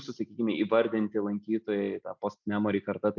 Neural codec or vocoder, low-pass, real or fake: none; 7.2 kHz; real